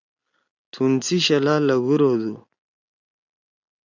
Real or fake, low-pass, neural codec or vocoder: real; 7.2 kHz; none